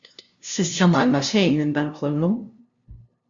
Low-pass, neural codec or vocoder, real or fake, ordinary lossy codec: 7.2 kHz; codec, 16 kHz, 0.5 kbps, FunCodec, trained on LibriTTS, 25 frames a second; fake; Opus, 64 kbps